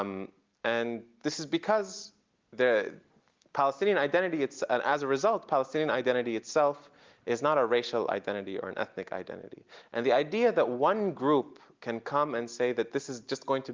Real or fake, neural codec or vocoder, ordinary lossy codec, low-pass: real; none; Opus, 32 kbps; 7.2 kHz